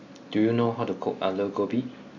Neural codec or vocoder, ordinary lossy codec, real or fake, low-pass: none; none; real; 7.2 kHz